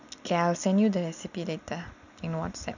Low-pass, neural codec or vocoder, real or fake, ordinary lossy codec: 7.2 kHz; none; real; none